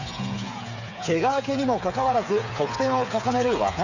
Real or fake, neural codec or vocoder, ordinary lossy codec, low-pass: fake; codec, 16 kHz, 8 kbps, FreqCodec, smaller model; none; 7.2 kHz